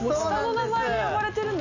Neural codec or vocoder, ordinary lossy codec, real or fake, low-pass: none; none; real; 7.2 kHz